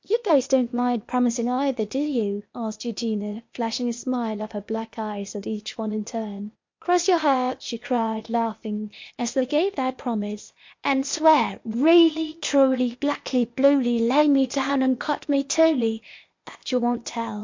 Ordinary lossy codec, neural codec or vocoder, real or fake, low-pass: MP3, 48 kbps; codec, 16 kHz, 0.8 kbps, ZipCodec; fake; 7.2 kHz